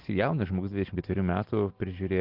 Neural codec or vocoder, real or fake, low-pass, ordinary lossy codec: none; real; 5.4 kHz; Opus, 16 kbps